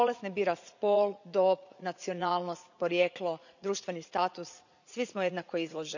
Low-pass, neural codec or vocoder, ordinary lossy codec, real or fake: 7.2 kHz; vocoder, 44.1 kHz, 80 mel bands, Vocos; none; fake